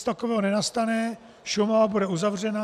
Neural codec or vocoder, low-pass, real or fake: none; 14.4 kHz; real